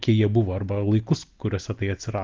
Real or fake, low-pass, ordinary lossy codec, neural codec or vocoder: real; 7.2 kHz; Opus, 16 kbps; none